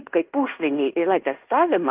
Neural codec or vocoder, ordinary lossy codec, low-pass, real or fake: codec, 16 kHz in and 24 kHz out, 0.9 kbps, LongCat-Audio-Codec, fine tuned four codebook decoder; Opus, 32 kbps; 3.6 kHz; fake